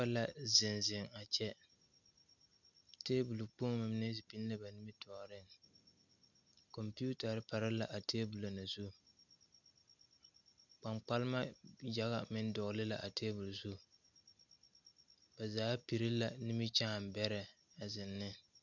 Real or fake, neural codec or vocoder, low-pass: real; none; 7.2 kHz